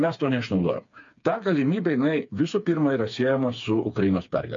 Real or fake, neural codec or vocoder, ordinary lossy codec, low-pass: fake; codec, 16 kHz, 4 kbps, FreqCodec, smaller model; MP3, 48 kbps; 7.2 kHz